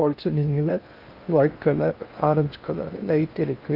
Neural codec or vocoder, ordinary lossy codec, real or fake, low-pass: codec, 16 kHz in and 24 kHz out, 0.6 kbps, FocalCodec, streaming, 2048 codes; Opus, 24 kbps; fake; 5.4 kHz